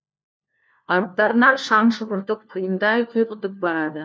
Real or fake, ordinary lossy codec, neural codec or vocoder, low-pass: fake; none; codec, 16 kHz, 1 kbps, FunCodec, trained on LibriTTS, 50 frames a second; none